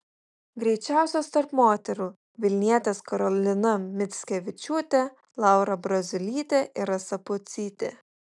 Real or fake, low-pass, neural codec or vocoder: real; 10.8 kHz; none